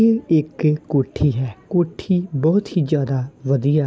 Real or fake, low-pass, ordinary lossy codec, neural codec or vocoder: real; none; none; none